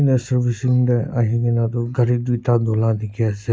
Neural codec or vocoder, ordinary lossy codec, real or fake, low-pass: none; none; real; none